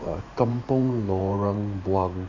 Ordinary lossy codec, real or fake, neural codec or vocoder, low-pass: none; fake; vocoder, 44.1 kHz, 128 mel bands every 512 samples, BigVGAN v2; 7.2 kHz